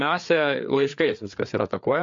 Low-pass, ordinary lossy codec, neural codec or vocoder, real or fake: 7.2 kHz; MP3, 48 kbps; codec, 16 kHz, 4 kbps, FreqCodec, larger model; fake